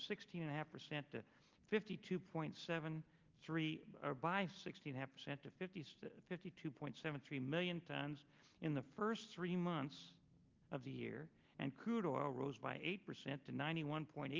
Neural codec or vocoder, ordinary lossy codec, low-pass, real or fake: none; Opus, 32 kbps; 7.2 kHz; real